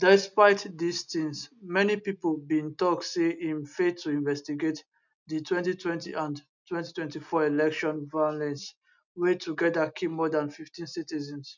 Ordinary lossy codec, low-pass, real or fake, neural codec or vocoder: none; 7.2 kHz; real; none